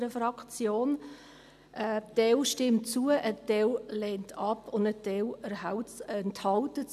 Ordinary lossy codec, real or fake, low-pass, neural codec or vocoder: none; real; 14.4 kHz; none